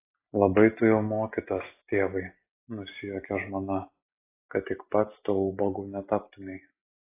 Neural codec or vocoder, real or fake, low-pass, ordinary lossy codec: none; real; 3.6 kHz; AAC, 24 kbps